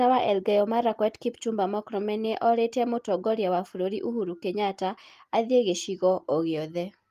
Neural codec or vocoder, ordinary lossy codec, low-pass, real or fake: none; Opus, 32 kbps; 14.4 kHz; real